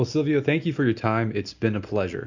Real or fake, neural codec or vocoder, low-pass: real; none; 7.2 kHz